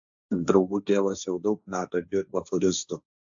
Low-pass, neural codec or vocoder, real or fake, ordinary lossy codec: 7.2 kHz; codec, 16 kHz, 1.1 kbps, Voila-Tokenizer; fake; AAC, 64 kbps